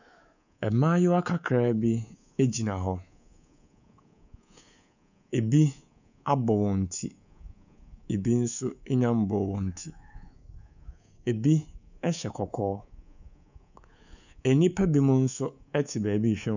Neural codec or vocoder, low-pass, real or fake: codec, 24 kHz, 3.1 kbps, DualCodec; 7.2 kHz; fake